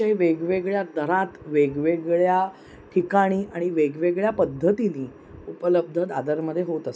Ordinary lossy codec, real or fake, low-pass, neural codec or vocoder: none; real; none; none